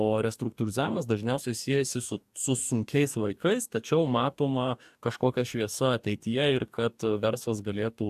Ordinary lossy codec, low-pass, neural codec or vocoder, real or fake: AAC, 96 kbps; 14.4 kHz; codec, 44.1 kHz, 2.6 kbps, DAC; fake